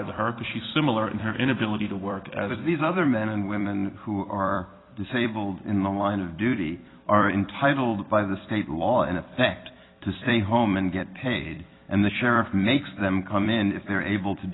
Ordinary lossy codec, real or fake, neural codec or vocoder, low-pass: AAC, 16 kbps; fake; vocoder, 44.1 kHz, 128 mel bands every 256 samples, BigVGAN v2; 7.2 kHz